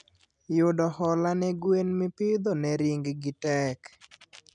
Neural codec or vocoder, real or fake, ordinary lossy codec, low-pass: none; real; none; 9.9 kHz